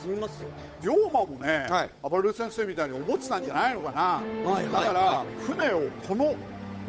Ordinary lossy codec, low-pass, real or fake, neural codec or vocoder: none; none; fake; codec, 16 kHz, 8 kbps, FunCodec, trained on Chinese and English, 25 frames a second